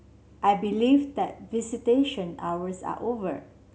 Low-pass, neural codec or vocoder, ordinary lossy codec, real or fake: none; none; none; real